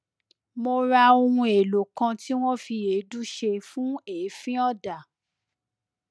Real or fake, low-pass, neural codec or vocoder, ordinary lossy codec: real; none; none; none